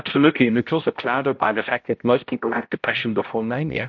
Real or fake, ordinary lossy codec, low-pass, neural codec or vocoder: fake; MP3, 48 kbps; 7.2 kHz; codec, 16 kHz, 0.5 kbps, X-Codec, HuBERT features, trained on balanced general audio